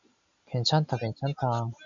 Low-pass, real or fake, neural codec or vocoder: 7.2 kHz; real; none